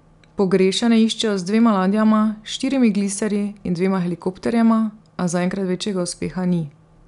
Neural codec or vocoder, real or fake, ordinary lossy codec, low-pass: none; real; none; 10.8 kHz